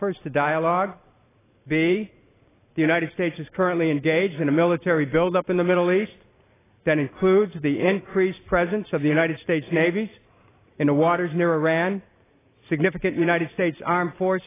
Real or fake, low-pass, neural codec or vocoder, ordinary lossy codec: fake; 3.6 kHz; vocoder, 44.1 kHz, 128 mel bands every 512 samples, BigVGAN v2; AAC, 16 kbps